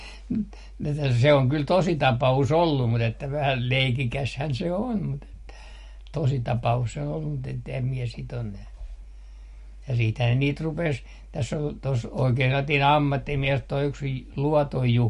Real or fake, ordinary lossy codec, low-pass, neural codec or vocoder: real; MP3, 48 kbps; 14.4 kHz; none